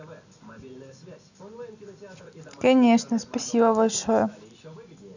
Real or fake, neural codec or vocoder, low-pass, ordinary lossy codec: real; none; 7.2 kHz; none